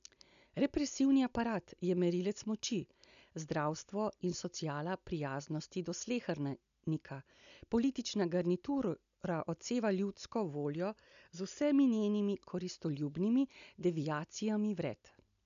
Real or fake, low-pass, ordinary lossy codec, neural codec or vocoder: real; 7.2 kHz; none; none